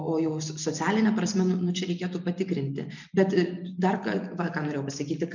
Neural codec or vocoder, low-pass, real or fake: none; 7.2 kHz; real